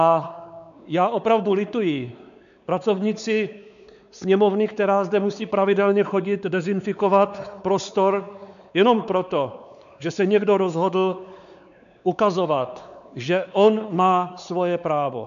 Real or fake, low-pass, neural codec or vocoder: fake; 7.2 kHz; codec, 16 kHz, 4 kbps, X-Codec, WavLM features, trained on Multilingual LibriSpeech